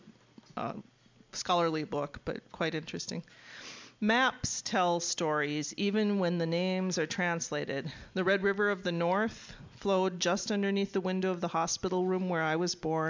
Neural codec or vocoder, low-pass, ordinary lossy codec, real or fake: none; 7.2 kHz; MP3, 64 kbps; real